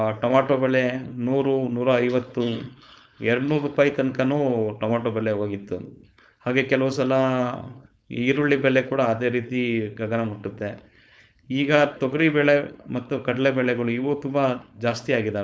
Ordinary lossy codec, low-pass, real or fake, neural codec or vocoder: none; none; fake; codec, 16 kHz, 4.8 kbps, FACodec